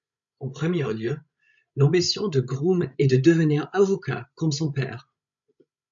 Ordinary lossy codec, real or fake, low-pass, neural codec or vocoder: MP3, 64 kbps; fake; 7.2 kHz; codec, 16 kHz, 16 kbps, FreqCodec, larger model